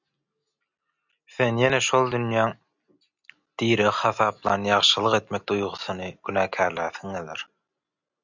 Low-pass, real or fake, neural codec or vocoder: 7.2 kHz; real; none